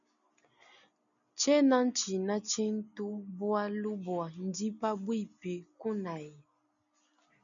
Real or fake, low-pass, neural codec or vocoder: real; 7.2 kHz; none